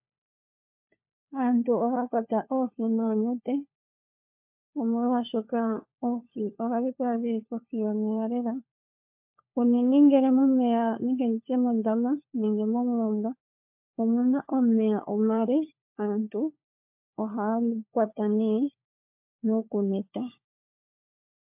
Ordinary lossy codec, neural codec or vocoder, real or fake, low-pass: MP3, 32 kbps; codec, 16 kHz, 4 kbps, FunCodec, trained on LibriTTS, 50 frames a second; fake; 3.6 kHz